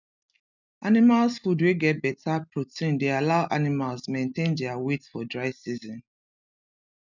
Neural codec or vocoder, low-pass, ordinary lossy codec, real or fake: none; 7.2 kHz; none; real